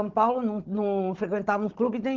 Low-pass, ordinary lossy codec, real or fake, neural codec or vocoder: 7.2 kHz; Opus, 16 kbps; fake; codec, 16 kHz, 16 kbps, FunCodec, trained on Chinese and English, 50 frames a second